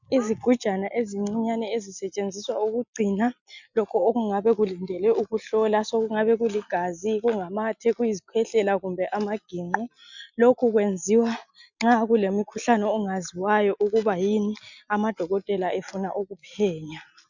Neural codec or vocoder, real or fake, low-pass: none; real; 7.2 kHz